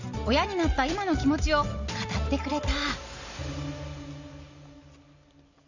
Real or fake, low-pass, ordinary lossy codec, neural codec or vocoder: real; 7.2 kHz; none; none